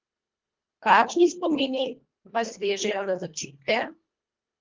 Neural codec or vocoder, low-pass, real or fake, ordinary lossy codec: codec, 24 kHz, 1.5 kbps, HILCodec; 7.2 kHz; fake; Opus, 32 kbps